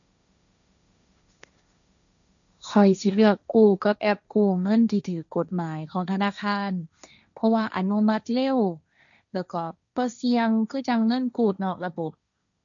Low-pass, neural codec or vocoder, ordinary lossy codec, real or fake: 7.2 kHz; codec, 16 kHz, 1.1 kbps, Voila-Tokenizer; none; fake